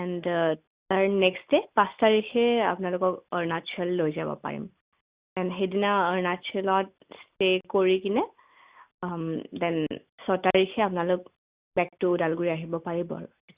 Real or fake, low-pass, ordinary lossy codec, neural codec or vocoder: real; 3.6 kHz; Opus, 64 kbps; none